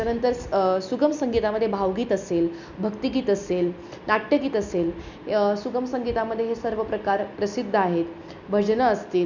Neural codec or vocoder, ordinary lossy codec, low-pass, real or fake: none; none; 7.2 kHz; real